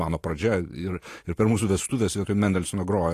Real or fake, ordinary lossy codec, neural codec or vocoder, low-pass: fake; AAC, 48 kbps; vocoder, 44.1 kHz, 128 mel bands every 512 samples, BigVGAN v2; 14.4 kHz